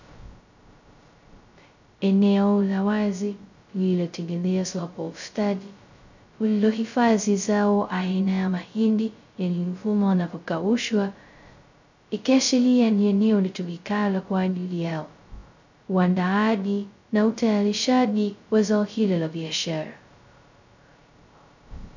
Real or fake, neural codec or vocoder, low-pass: fake; codec, 16 kHz, 0.2 kbps, FocalCodec; 7.2 kHz